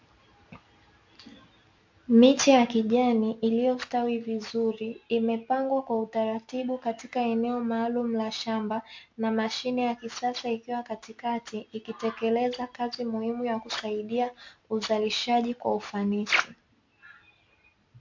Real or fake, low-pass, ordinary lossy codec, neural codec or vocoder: real; 7.2 kHz; MP3, 48 kbps; none